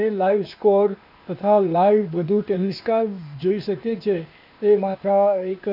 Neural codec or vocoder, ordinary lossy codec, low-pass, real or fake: codec, 16 kHz, 0.8 kbps, ZipCodec; none; 5.4 kHz; fake